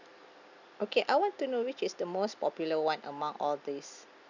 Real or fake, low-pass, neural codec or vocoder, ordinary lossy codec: real; 7.2 kHz; none; none